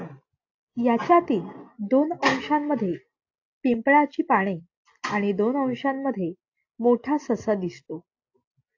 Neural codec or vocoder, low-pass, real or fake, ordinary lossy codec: none; 7.2 kHz; real; AAC, 48 kbps